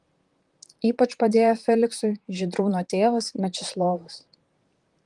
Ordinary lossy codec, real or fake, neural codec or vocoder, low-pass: Opus, 24 kbps; fake; vocoder, 44.1 kHz, 128 mel bands, Pupu-Vocoder; 10.8 kHz